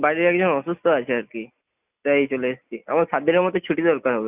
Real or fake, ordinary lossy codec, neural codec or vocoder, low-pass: real; none; none; 3.6 kHz